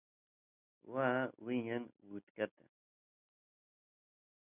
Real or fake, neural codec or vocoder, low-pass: real; none; 3.6 kHz